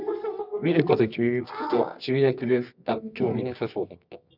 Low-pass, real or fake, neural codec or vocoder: 5.4 kHz; fake; codec, 24 kHz, 0.9 kbps, WavTokenizer, medium music audio release